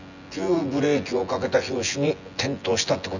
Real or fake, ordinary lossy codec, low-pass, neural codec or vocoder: fake; none; 7.2 kHz; vocoder, 24 kHz, 100 mel bands, Vocos